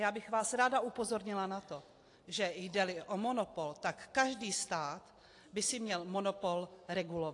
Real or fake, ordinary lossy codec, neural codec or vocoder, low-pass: real; AAC, 48 kbps; none; 10.8 kHz